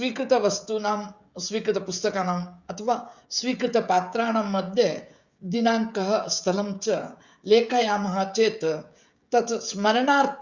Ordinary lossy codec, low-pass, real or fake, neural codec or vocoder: Opus, 64 kbps; 7.2 kHz; fake; codec, 16 kHz, 16 kbps, FreqCodec, smaller model